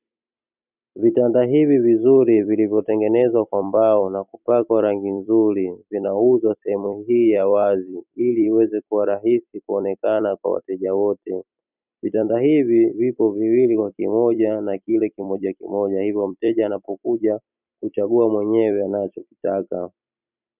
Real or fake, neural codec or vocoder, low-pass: fake; vocoder, 44.1 kHz, 128 mel bands every 256 samples, BigVGAN v2; 3.6 kHz